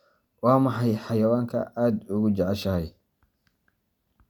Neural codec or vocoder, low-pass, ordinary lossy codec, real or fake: none; 19.8 kHz; none; real